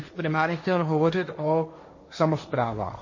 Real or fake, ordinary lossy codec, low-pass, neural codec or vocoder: fake; MP3, 32 kbps; 7.2 kHz; codec, 16 kHz, 1.1 kbps, Voila-Tokenizer